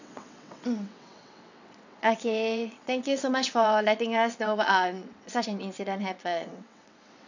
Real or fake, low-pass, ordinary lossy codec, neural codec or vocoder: fake; 7.2 kHz; none; vocoder, 22.05 kHz, 80 mel bands, WaveNeXt